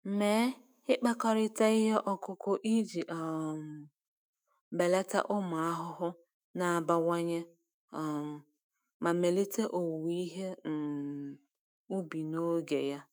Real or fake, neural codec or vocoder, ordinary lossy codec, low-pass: fake; autoencoder, 48 kHz, 128 numbers a frame, DAC-VAE, trained on Japanese speech; none; none